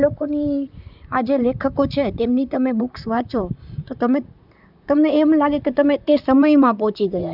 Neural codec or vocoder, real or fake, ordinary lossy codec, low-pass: vocoder, 44.1 kHz, 128 mel bands, Pupu-Vocoder; fake; none; 5.4 kHz